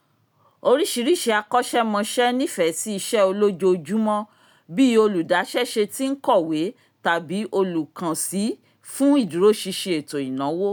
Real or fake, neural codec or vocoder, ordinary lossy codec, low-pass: real; none; none; none